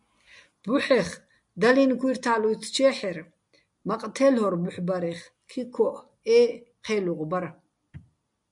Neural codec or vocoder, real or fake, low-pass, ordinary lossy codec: none; real; 10.8 kHz; MP3, 96 kbps